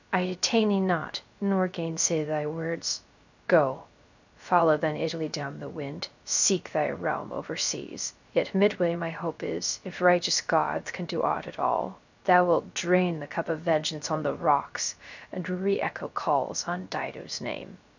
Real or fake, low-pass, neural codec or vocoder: fake; 7.2 kHz; codec, 16 kHz, 0.3 kbps, FocalCodec